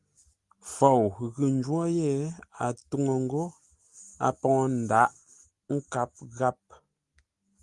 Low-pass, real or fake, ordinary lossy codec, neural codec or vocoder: 10.8 kHz; real; Opus, 24 kbps; none